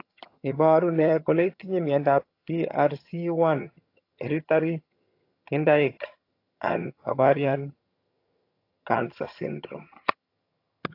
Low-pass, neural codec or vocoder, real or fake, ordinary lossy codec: 5.4 kHz; vocoder, 22.05 kHz, 80 mel bands, HiFi-GAN; fake; AAC, 32 kbps